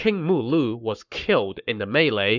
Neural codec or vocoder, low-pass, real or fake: codec, 44.1 kHz, 7.8 kbps, Pupu-Codec; 7.2 kHz; fake